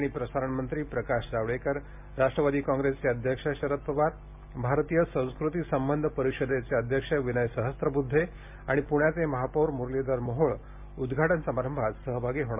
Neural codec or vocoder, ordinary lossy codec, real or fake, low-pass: none; MP3, 32 kbps; real; 3.6 kHz